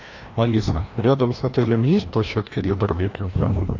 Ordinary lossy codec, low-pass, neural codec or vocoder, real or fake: AAC, 48 kbps; 7.2 kHz; codec, 16 kHz, 1 kbps, FreqCodec, larger model; fake